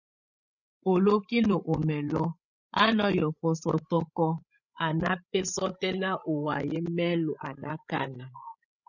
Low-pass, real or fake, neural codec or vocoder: 7.2 kHz; fake; codec, 16 kHz, 8 kbps, FreqCodec, larger model